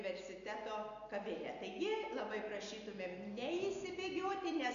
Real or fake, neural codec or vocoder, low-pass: real; none; 7.2 kHz